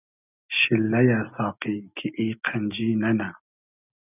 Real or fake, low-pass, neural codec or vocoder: real; 3.6 kHz; none